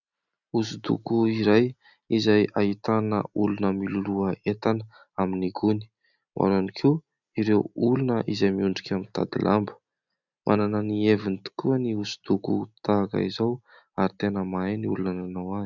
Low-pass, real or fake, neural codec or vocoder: 7.2 kHz; real; none